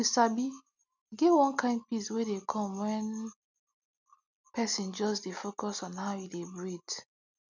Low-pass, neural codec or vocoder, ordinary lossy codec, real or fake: 7.2 kHz; none; none; real